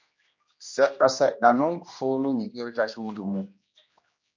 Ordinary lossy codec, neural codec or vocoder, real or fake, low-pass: MP3, 48 kbps; codec, 16 kHz, 1 kbps, X-Codec, HuBERT features, trained on general audio; fake; 7.2 kHz